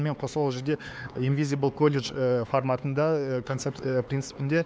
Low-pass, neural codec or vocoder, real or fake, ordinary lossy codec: none; codec, 16 kHz, 4 kbps, X-Codec, HuBERT features, trained on LibriSpeech; fake; none